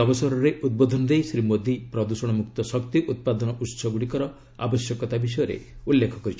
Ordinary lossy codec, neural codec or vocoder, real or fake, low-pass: none; none; real; none